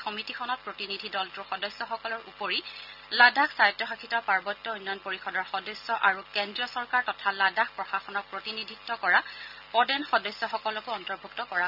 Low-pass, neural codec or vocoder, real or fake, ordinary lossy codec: 5.4 kHz; none; real; none